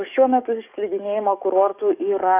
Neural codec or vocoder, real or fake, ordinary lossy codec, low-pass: codec, 44.1 kHz, 7.8 kbps, DAC; fake; AAC, 24 kbps; 3.6 kHz